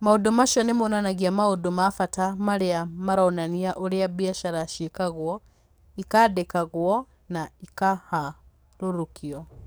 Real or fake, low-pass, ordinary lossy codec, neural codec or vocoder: fake; none; none; codec, 44.1 kHz, 7.8 kbps, DAC